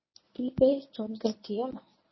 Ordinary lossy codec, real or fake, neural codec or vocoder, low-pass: MP3, 24 kbps; fake; codec, 24 kHz, 0.9 kbps, WavTokenizer, medium speech release version 1; 7.2 kHz